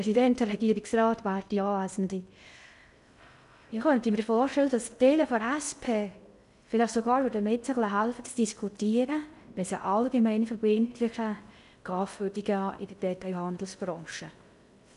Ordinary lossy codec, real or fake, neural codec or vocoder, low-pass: none; fake; codec, 16 kHz in and 24 kHz out, 0.6 kbps, FocalCodec, streaming, 2048 codes; 10.8 kHz